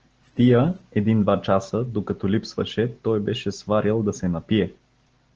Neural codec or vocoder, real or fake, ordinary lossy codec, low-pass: none; real; Opus, 16 kbps; 7.2 kHz